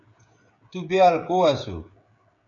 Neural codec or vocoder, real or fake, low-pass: codec, 16 kHz, 16 kbps, FreqCodec, smaller model; fake; 7.2 kHz